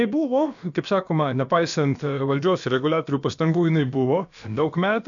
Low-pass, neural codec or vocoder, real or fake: 7.2 kHz; codec, 16 kHz, about 1 kbps, DyCAST, with the encoder's durations; fake